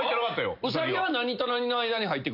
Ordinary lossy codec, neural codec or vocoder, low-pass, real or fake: none; none; 5.4 kHz; real